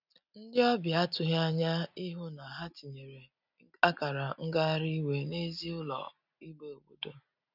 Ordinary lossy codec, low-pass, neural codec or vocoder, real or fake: none; 5.4 kHz; none; real